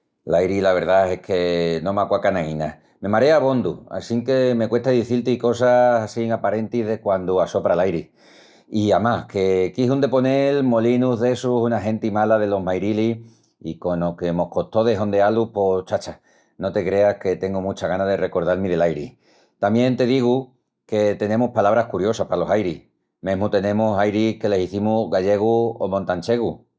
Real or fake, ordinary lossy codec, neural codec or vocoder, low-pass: real; none; none; none